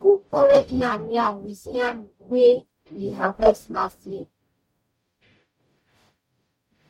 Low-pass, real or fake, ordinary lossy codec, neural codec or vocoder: 19.8 kHz; fake; MP3, 64 kbps; codec, 44.1 kHz, 0.9 kbps, DAC